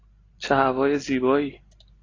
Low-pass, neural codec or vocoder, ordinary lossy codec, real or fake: 7.2 kHz; none; AAC, 32 kbps; real